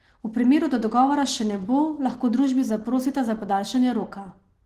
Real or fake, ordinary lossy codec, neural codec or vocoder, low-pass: real; Opus, 16 kbps; none; 14.4 kHz